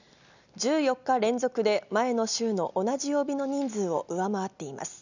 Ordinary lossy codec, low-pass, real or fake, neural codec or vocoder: none; 7.2 kHz; real; none